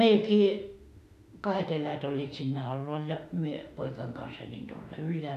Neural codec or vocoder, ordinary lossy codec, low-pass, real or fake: autoencoder, 48 kHz, 32 numbers a frame, DAC-VAE, trained on Japanese speech; none; 14.4 kHz; fake